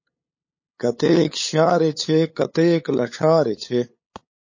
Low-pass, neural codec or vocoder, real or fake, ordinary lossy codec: 7.2 kHz; codec, 16 kHz, 8 kbps, FunCodec, trained on LibriTTS, 25 frames a second; fake; MP3, 32 kbps